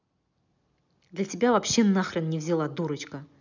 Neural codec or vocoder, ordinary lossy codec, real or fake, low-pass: none; none; real; 7.2 kHz